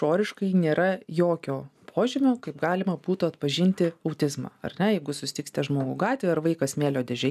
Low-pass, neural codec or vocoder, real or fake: 14.4 kHz; none; real